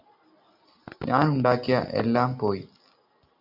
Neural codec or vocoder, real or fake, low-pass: none; real; 5.4 kHz